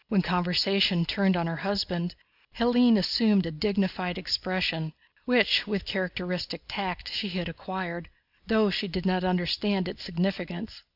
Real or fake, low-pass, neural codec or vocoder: real; 5.4 kHz; none